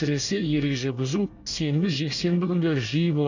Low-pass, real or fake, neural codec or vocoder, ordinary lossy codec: 7.2 kHz; fake; codec, 24 kHz, 1 kbps, SNAC; none